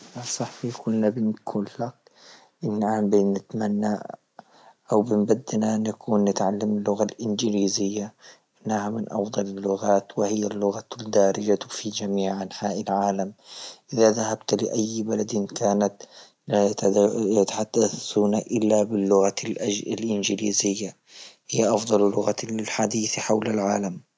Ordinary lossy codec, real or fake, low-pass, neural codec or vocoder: none; real; none; none